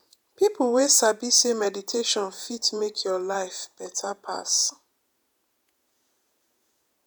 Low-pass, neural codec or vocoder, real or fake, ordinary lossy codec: none; vocoder, 48 kHz, 128 mel bands, Vocos; fake; none